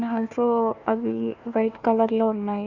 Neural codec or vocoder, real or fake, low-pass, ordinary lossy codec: codec, 16 kHz, 1 kbps, FunCodec, trained on Chinese and English, 50 frames a second; fake; 7.2 kHz; none